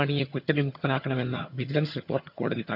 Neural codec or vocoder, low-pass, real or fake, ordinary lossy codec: vocoder, 22.05 kHz, 80 mel bands, HiFi-GAN; 5.4 kHz; fake; none